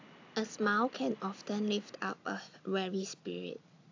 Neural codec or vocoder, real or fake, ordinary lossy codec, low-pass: none; real; AAC, 48 kbps; 7.2 kHz